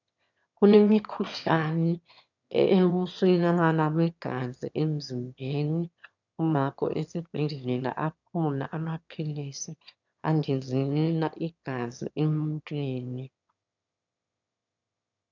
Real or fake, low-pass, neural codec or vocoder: fake; 7.2 kHz; autoencoder, 22.05 kHz, a latent of 192 numbers a frame, VITS, trained on one speaker